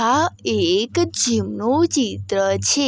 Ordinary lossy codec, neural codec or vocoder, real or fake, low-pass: none; none; real; none